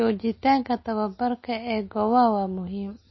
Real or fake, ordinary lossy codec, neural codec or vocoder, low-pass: real; MP3, 24 kbps; none; 7.2 kHz